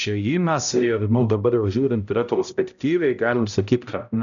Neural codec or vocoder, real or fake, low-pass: codec, 16 kHz, 0.5 kbps, X-Codec, HuBERT features, trained on balanced general audio; fake; 7.2 kHz